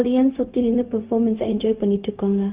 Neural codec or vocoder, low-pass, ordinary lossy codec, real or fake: codec, 16 kHz, 0.4 kbps, LongCat-Audio-Codec; 3.6 kHz; Opus, 24 kbps; fake